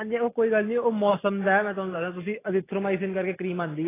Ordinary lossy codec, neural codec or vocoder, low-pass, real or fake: AAC, 16 kbps; none; 3.6 kHz; real